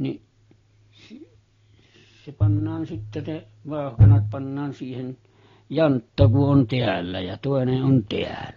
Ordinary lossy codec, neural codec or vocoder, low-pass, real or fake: AAC, 32 kbps; none; 7.2 kHz; real